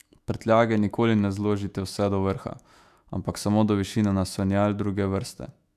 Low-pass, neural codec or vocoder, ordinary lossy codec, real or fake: 14.4 kHz; autoencoder, 48 kHz, 128 numbers a frame, DAC-VAE, trained on Japanese speech; none; fake